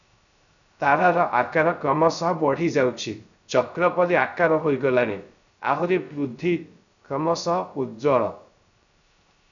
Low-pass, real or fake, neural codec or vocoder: 7.2 kHz; fake; codec, 16 kHz, 0.3 kbps, FocalCodec